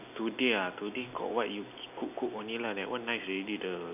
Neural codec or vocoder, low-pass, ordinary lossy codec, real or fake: none; 3.6 kHz; none; real